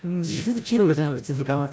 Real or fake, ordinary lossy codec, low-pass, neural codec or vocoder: fake; none; none; codec, 16 kHz, 0.5 kbps, FreqCodec, larger model